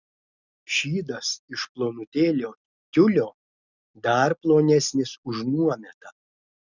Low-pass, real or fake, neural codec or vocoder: 7.2 kHz; real; none